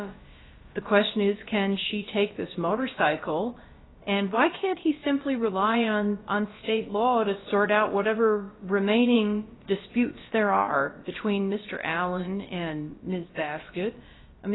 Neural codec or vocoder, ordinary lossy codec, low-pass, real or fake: codec, 16 kHz, about 1 kbps, DyCAST, with the encoder's durations; AAC, 16 kbps; 7.2 kHz; fake